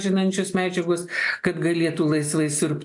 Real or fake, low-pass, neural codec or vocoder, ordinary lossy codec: real; 10.8 kHz; none; AAC, 64 kbps